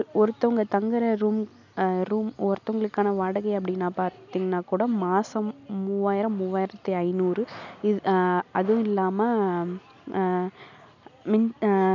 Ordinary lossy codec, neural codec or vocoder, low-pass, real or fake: none; none; 7.2 kHz; real